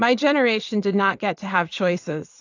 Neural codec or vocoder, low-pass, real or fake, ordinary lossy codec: vocoder, 22.05 kHz, 80 mel bands, WaveNeXt; 7.2 kHz; fake; AAC, 48 kbps